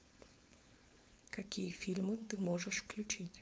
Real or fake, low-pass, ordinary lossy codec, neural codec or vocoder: fake; none; none; codec, 16 kHz, 4.8 kbps, FACodec